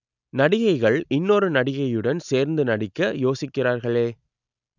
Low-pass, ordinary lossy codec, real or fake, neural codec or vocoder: 7.2 kHz; none; real; none